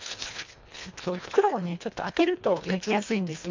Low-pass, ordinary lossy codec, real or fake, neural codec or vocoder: 7.2 kHz; MP3, 64 kbps; fake; codec, 24 kHz, 1.5 kbps, HILCodec